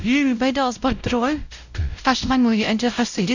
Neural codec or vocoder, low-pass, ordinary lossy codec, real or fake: codec, 16 kHz, 0.5 kbps, X-Codec, WavLM features, trained on Multilingual LibriSpeech; 7.2 kHz; none; fake